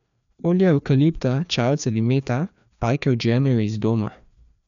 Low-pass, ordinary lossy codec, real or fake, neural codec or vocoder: 7.2 kHz; MP3, 96 kbps; fake; codec, 16 kHz, 2 kbps, FreqCodec, larger model